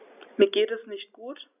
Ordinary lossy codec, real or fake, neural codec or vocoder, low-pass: none; real; none; 3.6 kHz